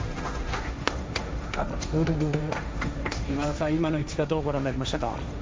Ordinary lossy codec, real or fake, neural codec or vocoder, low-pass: none; fake; codec, 16 kHz, 1.1 kbps, Voila-Tokenizer; none